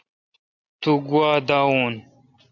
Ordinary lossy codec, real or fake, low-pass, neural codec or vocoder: MP3, 48 kbps; real; 7.2 kHz; none